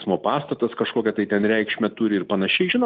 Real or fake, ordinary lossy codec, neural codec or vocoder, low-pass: real; Opus, 32 kbps; none; 7.2 kHz